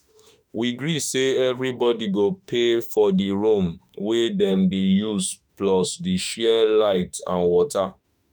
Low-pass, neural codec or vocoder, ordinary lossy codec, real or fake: none; autoencoder, 48 kHz, 32 numbers a frame, DAC-VAE, trained on Japanese speech; none; fake